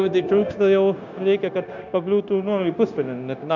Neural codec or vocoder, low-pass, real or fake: codec, 16 kHz, 0.9 kbps, LongCat-Audio-Codec; 7.2 kHz; fake